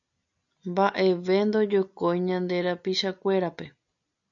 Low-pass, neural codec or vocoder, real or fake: 7.2 kHz; none; real